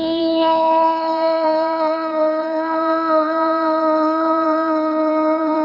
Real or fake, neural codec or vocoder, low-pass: fake; codec, 16 kHz in and 24 kHz out, 1.1 kbps, FireRedTTS-2 codec; 5.4 kHz